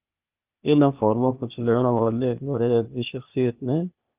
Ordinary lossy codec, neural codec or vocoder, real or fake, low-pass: Opus, 32 kbps; codec, 16 kHz, 0.8 kbps, ZipCodec; fake; 3.6 kHz